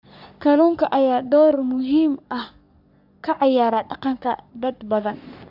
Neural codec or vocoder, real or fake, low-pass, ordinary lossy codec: codec, 44.1 kHz, 3.4 kbps, Pupu-Codec; fake; 5.4 kHz; none